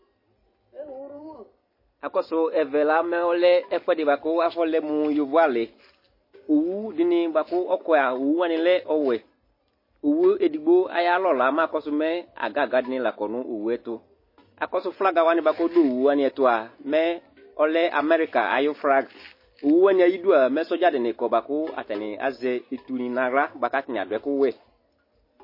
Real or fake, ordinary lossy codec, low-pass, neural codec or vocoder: real; MP3, 24 kbps; 5.4 kHz; none